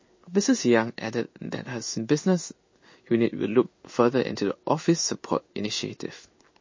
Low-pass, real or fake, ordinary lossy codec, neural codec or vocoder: 7.2 kHz; fake; MP3, 32 kbps; codec, 16 kHz in and 24 kHz out, 1 kbps, XY-Tokenizer